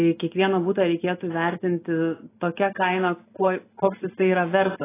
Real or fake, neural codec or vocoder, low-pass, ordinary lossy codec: real; none; 3.6 kHz; AAC, 16 kbps